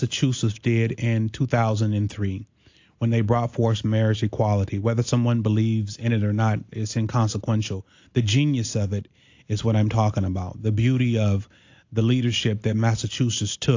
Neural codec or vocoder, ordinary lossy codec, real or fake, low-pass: none; AAC, 48 kbps; real; 7.2 kHz